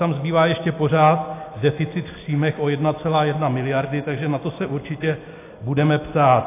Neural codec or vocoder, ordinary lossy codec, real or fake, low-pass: none; AAC, 24 kbps; real; 3.6 kHz